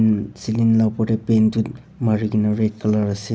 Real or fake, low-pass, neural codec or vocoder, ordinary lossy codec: real; none; none; none